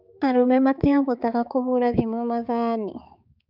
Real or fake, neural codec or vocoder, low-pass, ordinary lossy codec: fake; codec, 16 kHz, 4 kbps, X-Codec, HuBERT features, trained on balanced general audio; 5.4 kHz; none